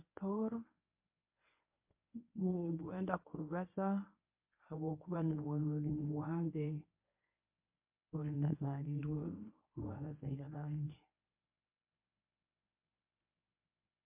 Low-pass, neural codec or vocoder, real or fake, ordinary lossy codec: 3.6 kHz; codec, 24 kHz, 0.9 kbps, WavTokenizer, medium speech release version 1; fake; MP3, 32 kbps